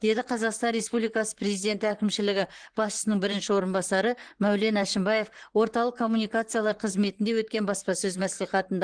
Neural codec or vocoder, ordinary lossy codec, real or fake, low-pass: vocoder, 44.1 kHz, 128 mel bands, Pupu-Vocoder; Opus, 16 kbps; fake; 9.9 kHz